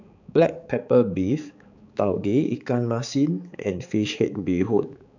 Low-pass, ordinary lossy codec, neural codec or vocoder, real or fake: 7.2 kHz; none; codec, 16 kHz, 4 kbps, X-Codec, HuBERT features, trained on balanced general audio; fake